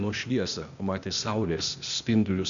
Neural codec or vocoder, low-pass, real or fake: codec, 16 kHz, 0.8 kbps, ZipCodec; 7.2 kHz; fake